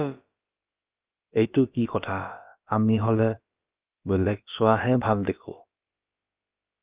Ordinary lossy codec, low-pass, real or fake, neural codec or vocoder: Opus, 24 kbps; 3.6 kHz; fake; codec, 16 kHz, about 1 kbps, DyCAST, with the encoder's durations